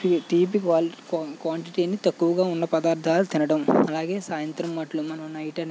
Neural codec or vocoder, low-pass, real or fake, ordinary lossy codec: none; none; real; none